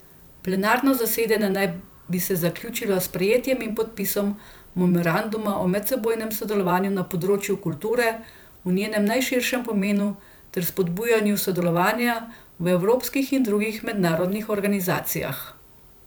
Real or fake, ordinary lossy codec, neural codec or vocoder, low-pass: fake; none; vocoder, 44.1 kHz, 128 mel bands every 512 samples, BigVGAN v2; none